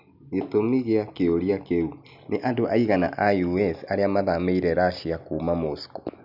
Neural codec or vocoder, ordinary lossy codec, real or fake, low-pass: none; none; real; 5.4 kHz